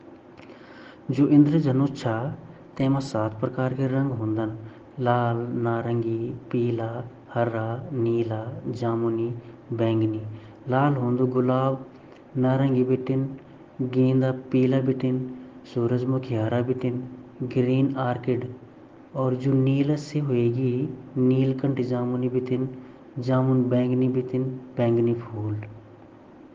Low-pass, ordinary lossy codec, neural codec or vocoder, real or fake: 7.2 kHz; Opus, 16 kbps; none; real